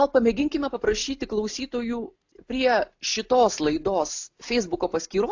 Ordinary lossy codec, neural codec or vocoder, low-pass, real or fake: AAC, 48 kbps; none; 7.2 kHz; real